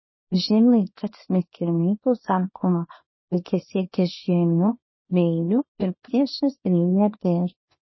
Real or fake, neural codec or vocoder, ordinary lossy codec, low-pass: fake; codec, 24 kHz, 0.9 kbps, WavTokenizer, small release; MP3, 24 kbps; 7.2 kHz